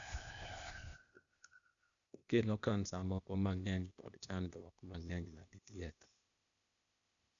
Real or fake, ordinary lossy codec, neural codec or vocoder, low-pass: fake; none; codec, 16 kHz, 0.8 kbps, ZipCodec; 7.2 kHz